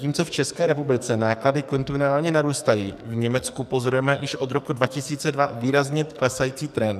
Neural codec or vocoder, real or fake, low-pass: codec, 44.1 kHz, 2.6 kbps, SNAC; fake; 14.4 kHz